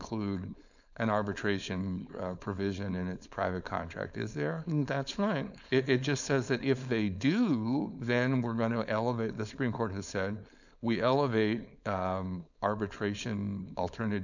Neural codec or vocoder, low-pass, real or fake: codec, 16 kHz, 4.8 kbps, FACodec; 7.2 kHz; fake